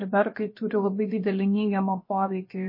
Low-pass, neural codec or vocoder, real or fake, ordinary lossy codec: 5.4 kHz; codec, 16 kHz, about 1 kbps, DyCAST, with the encoder's durations; fake; MP3, 24 kbps